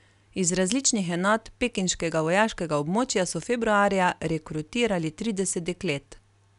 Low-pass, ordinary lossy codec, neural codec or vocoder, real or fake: 10.8 kHz; none; none; real